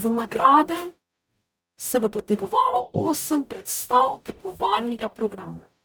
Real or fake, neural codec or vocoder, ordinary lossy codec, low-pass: fake; codec, 44.1 kHz, 0.9 kbps, DAC; none; none